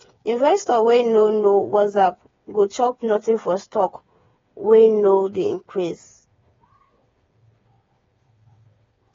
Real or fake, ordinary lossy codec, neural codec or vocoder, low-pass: fake; AAC, 24 kbps; codec, 16 kHz, 8 kbps, FreqCodec, smaller model; 7.2 kHz